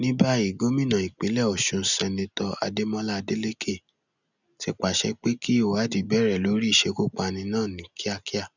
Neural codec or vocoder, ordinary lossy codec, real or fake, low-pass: none; none; real; 7.2 kHz